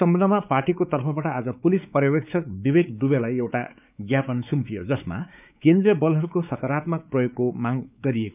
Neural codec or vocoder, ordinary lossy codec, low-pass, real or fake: codec, 16 kHz, 4 kbps, X-Codec, WavLM features, trained on Multilingual LibriSpeech; none; 3.6 kHz; fake